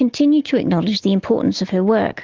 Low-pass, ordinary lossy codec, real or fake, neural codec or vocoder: 7.2 kHz; Opus, 16 kbps; real; none